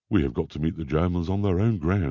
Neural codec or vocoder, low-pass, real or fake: none; 7.2 kHz; real